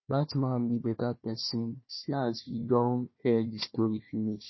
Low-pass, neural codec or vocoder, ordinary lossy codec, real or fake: 7.2 kHz; codec, 16 kHz, 1 kbps, FunCodec, trained on Chinese and English, 50 frames a second; MP3, 24 kbps; fake